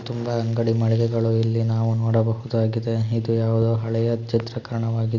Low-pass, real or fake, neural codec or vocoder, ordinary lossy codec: 7.2 kHz; real; none; Opus, 64 kbps